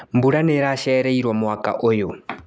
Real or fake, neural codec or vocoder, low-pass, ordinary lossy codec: real; none; none; none